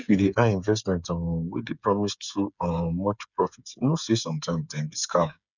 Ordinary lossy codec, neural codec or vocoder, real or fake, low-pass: none; codec, 16 kHz, 8 kbps, FreqCodec, smaller model; fake; 7.2 kHz